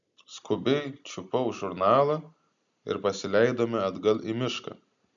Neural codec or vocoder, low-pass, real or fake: none; 7.2 kHz; real